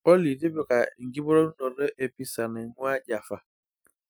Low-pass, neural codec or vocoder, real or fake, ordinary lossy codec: none; none; real; none